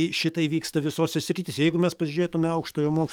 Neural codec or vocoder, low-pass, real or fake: codec, 44.1 kHz, 7.8 kbps, DAC; 19.8 kHz; fake